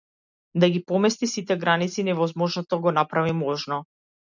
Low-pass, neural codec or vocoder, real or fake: 7.2 kHz; none; real